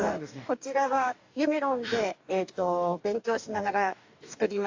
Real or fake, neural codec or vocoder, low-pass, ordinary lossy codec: fake; codec, 44.1 kHz, 2.6 kbps, DAC; 7.2 kHz; AAC, 48 kbps